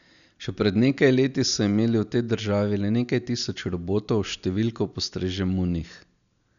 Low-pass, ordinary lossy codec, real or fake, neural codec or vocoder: 7.2 kHz; none; real; none